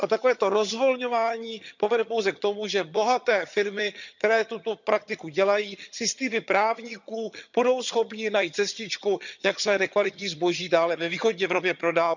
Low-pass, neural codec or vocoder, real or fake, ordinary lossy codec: 7.2 kHz; vocoder, 22.05 kHz, 80 mel bands, HiFi-GAN; fake; none